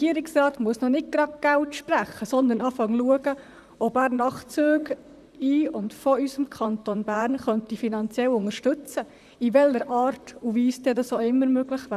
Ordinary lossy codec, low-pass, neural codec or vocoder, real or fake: none; 14.4 kHz; vocoder, 44.1 kHz, 128 mel bands, Pupu-Vocoder; fake